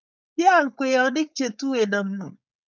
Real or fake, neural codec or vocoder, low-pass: fake; codec, 16 kHz, 4.8 kbps, FACodec; 7.2 kHz